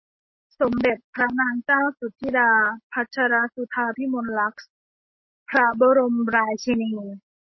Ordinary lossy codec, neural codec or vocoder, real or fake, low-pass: MP3, 24 kbps; none; real; 7.2 kHz